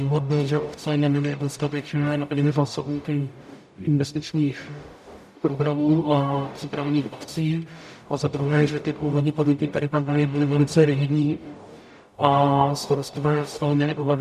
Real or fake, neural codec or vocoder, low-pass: fake; codec, 44.1 kHz, 0.9 kbps, DAC; 14.4 kHz